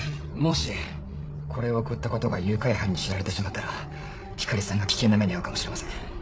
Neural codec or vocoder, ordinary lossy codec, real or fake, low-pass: codec, 16 kHz, 8 kbps, FreqCodec, larger model; none; fake; none